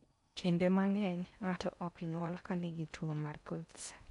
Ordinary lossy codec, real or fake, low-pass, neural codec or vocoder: none; fake; 10.8 kHz; codec, 16 kHz in and 24 kHz out, 0.6 kbps, FocalCodec, streaming, 4096 codes